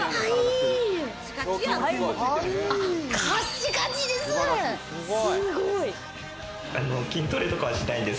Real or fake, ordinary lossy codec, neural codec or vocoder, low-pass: real; none; none; none